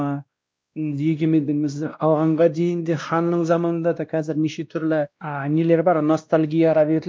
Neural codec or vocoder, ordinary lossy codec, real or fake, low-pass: codec, 16 kHz, 1 kbps, X-Codec, WavLM features, trained on Multilingual LibriSpeech; none; fake; none